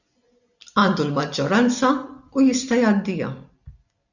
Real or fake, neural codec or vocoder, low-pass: real; none; 7.2 kHz